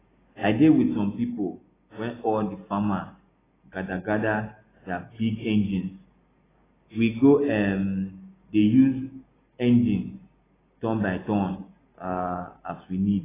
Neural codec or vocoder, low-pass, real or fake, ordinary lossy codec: none; 3.6 kHz; real; AAC, 16 kbps